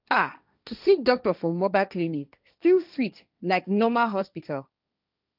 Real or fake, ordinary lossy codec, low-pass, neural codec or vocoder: fake; none; 5.4 kHz; codec, 16 kHz, 1.1 kbps, Voila-Tokenizer